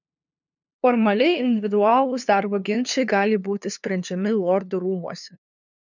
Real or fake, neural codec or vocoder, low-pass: fake; codec, 16 kHz, 2 kbps, FunCodec, trained on LibriTTS, 25 frames a second; 7.2 kHz